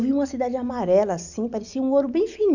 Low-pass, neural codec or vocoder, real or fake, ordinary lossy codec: 7.2 kHz; none; real; none